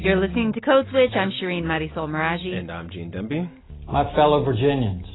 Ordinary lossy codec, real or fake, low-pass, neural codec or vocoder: AAC, 16 kbps; real; 7.2 kHz; none